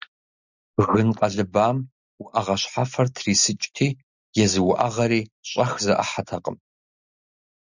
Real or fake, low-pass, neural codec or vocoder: real; 7.2 kHz; none